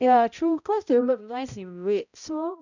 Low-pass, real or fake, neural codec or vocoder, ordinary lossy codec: 7.2 kHz; fake; codec, 16 kHz, 0.5 kbps, X-Codec, HuBERT features, trained on balanced general audio; none